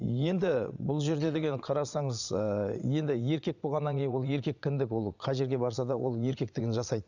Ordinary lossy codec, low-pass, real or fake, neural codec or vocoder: none; 7.2 kHz; fake; vocoder, 22.05 kHz, 80 mel bands, Vocos